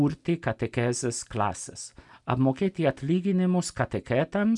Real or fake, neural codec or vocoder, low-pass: real; none; 10.8 kHz